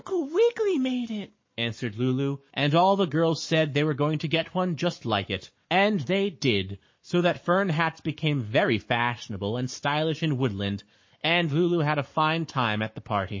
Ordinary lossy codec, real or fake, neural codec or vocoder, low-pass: MP3, 32 kbps; fake; codec, 44.1 kHz, 7.8 kbps, Pupu-Codec; 7.2 kHz